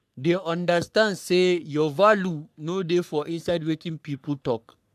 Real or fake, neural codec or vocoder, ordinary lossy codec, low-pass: fake; codec, 44.1 kHz, 3.4 kbps, Pupu-Codec; none; 14.4 kHz